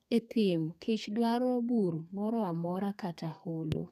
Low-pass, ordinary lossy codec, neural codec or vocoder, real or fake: 14.4 kHz; none; codec, 32 kHz, 1.9 kbps, SNAC; fake